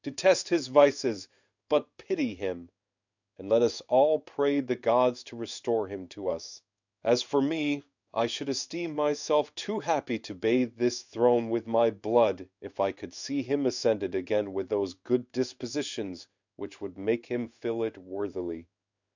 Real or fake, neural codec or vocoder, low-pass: fake; codec, 16 kHz in and 24 kHz out, 1 kbps, XY-Tokenizer; 7.2 kHz